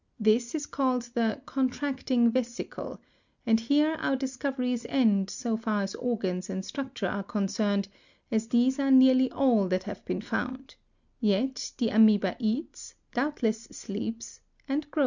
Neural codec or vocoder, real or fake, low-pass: none; real; 7.2 kHz